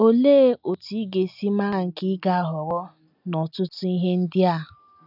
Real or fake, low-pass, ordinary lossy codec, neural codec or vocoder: real; 5.4 kHz; none; none